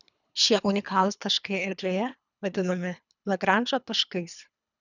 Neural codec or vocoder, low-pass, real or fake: codec, 24 kHz, 3 kbps, HILCodec; 7.2 kHz; fake